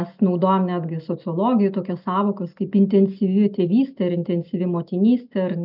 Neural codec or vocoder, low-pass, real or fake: none; 5.4 kHz; real